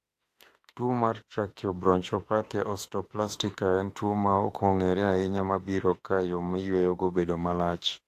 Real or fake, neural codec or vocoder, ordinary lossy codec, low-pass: fake; autoencoder, 48 kHz, 32 numbers a frame, DAC-VAE, trained on Japanese speech; AAC, 64 kbps; 14.4 kHz